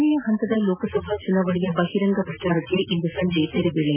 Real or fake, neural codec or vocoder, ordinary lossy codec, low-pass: real; none; none; 3.6 kHz